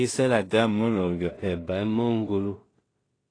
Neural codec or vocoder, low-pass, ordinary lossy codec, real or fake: codec, 16 kHz in and 24 kHz out, 0.4 kbps, LongCat-Audio-Codec, two codebook decoder; 9.9 kHz; AAC, 32 kbps; fake